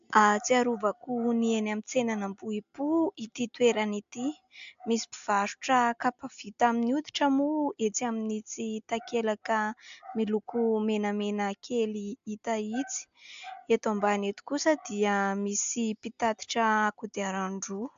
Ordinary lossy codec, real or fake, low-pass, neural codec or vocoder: AAC, 64 kbps; real; 7.2 kHz; none